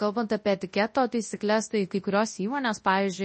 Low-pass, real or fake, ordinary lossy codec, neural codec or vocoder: 10.8 kHz; fake; MP3, 32 kbps; codec, 24 kHz, 0.9 kbps, WavTokenizer, large speech release